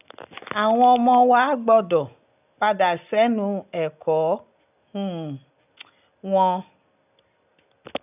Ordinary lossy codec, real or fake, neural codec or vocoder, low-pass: none; real; none; 3.6 kHz